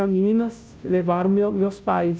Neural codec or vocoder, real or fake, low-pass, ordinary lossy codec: codec, 16 kHz, 0.5 kbps, FunCodec, trained on Chinese and English, 25 frames a second; fake; none; none